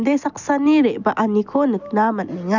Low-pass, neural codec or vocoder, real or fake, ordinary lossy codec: 7.2 kHz; none; real; none